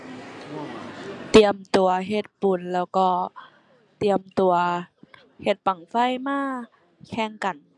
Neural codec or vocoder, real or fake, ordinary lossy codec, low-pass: none; real; none; 10.8 kHz